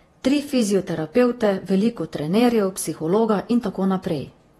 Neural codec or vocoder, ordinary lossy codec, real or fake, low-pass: vocoder, 48 kHz, 128 mel bands, Vocos; AAC, 32 kbps; fake; 19.8 kHz